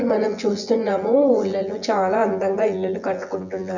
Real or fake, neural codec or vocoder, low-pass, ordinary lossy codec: fake; vocoder, 24 kHz, 100 mel bands, Vocos; 7.2 kHz; none